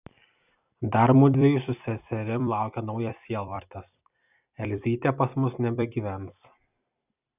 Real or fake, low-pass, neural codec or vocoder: fake; 3.6 kHz; vocoder, 44.1 kHz, 128 mel bands every 256 samples, BigVGAN v2